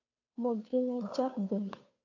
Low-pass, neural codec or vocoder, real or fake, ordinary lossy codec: 7.2 kHz; codec, 16 kHz, 2 kbps, FunCodec, trained on Chinese and English, 25 frames a second; fake; AAC, 48 kbps